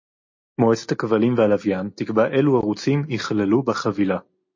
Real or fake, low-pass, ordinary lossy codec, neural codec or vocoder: real; 7.2 kHz; MP3, 32 kbps; none